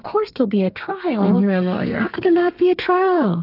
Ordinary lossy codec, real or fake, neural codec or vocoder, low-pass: AAC, 48 kbps; fake; codec, 32 kHz, 1.9 kbps, SNAC; 5.4 kHz